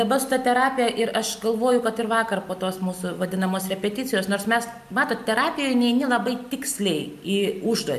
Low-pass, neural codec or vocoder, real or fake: 14.4 kHz; none; real